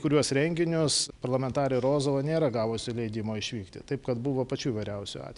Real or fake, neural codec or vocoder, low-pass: real; none; 10.8 kHz